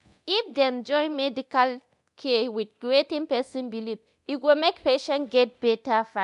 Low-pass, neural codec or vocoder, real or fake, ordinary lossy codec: 10.8 kHz; codec, 24 kHz, 0.9 kbps, DualCodec; fake; none